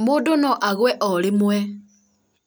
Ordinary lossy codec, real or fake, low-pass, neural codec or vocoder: none; real; none; none